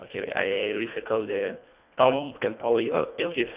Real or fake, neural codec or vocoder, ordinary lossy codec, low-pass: fake; codec, 24 kHz, 1.5 kbps, HILCodec; Opus, 64 kbps; 3.6 kHz